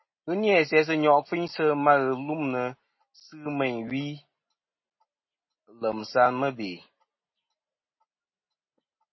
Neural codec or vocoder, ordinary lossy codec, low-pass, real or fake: none; MP3, 24 kbps; 7.2 kHz; real